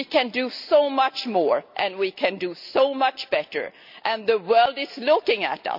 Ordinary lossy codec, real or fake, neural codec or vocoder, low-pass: none; real; none; 5.4 kHz